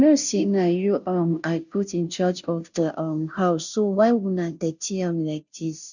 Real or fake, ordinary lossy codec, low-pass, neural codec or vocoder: fake; none; 7.2 kHz; codec, 16 kHz, 0.5 kbps, FunCodec, trained on Chinese and English, 25 frames a second